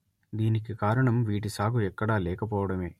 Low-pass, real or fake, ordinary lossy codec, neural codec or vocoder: 19.8 kHz; real; MP3, 64 kbps; none